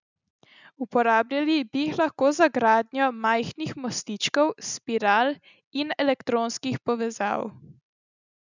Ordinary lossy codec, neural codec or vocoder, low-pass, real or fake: none; none; 7.2 kHz; real